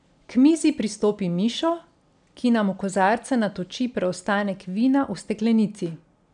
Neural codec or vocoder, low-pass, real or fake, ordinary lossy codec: none; 9.9 kHz; real; none